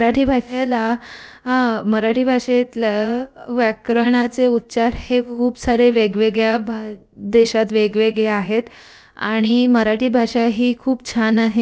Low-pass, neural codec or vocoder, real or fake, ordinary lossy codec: none; codec, 16 kHz, about 1 kbps, DyCAST, with the encoder's durations; fake; none